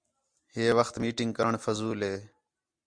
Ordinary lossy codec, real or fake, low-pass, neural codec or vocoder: Opus, 64 kbps; real; 9.9 kHz; none